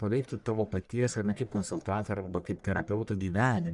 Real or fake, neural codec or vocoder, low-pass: fake; codec, 44.1 kHz, 1.7 kbps, Pupu-Codec; 10.8 kHz